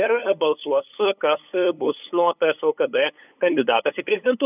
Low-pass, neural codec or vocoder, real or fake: 3.6 kHz; codec, 16 kHz, 8 kbps, FunCodec, trained on LibriTTS, 25 frames a second; fake